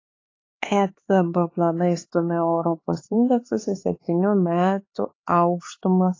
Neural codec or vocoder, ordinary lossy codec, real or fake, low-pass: codec, 16 kHz, 4 kbps, X-Codec, HuBERT features, trained on LibriSpeech; AAC, 32 kbps; fake; 7.2 kHz